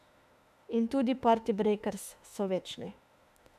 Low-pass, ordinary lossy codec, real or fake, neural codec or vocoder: 14.4 kHz; none; fake; autoencoder, 48 kHz, 32 numbers a frame, DAC-VAE, trained on Japanese speech